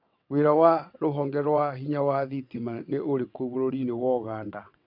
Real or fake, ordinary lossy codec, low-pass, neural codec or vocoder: fake; MP3, 48 kbps; 5.4 kHz; vocoder, 22.05 kHz, 80 mel bands, WaveNeXt